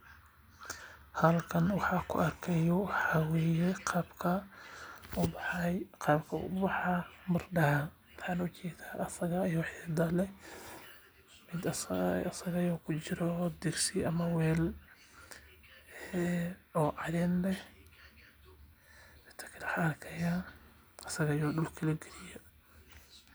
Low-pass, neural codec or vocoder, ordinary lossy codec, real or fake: none; none; none; real